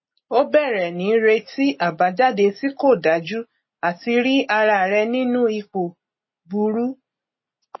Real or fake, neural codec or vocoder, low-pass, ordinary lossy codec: real; none; 7.2 kHz; MP3, 24 kbps